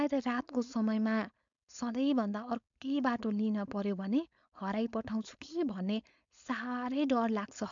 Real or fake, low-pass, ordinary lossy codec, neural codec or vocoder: fake; 7.2 kHz; MP3, 64 kbps; codec, 16 kHz, 4.8 kbps, FACodec